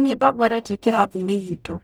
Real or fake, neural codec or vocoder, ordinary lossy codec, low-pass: fake; codec, 44.1 kHz, 0.9 kbps, DAC; none; none